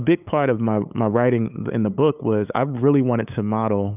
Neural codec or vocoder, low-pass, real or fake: codec, 16 kHz, 8 kbps, FunCodec, trained on LibriTTS, 25 frames a second; 3.6 kHz; fake